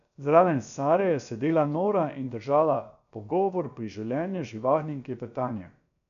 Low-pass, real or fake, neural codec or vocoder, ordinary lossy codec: 7.2 kHz; fake; codec, 16 kHz, about 1 kbps, DyCAST, with the encoder's durations; none